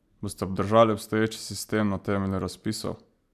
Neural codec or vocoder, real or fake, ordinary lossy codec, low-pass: none; real; none; 14.4 kHz